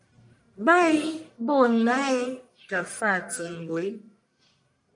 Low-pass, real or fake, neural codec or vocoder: 10.8 kHz; fake; codec, 44.1 kHz, 1.7 kbps, Pupu-Codec